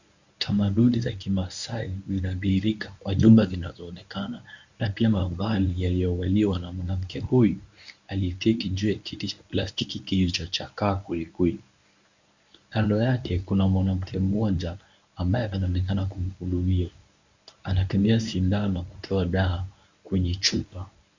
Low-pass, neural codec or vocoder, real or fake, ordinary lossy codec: 7.2 kHz; codec, 24 kHz, 0.9 kbps, WavTokenizer, medium speech release version 1; fake; Opus, 64 kbps